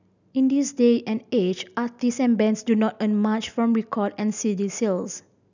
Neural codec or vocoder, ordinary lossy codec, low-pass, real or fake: none; none; 7.2 kHz; real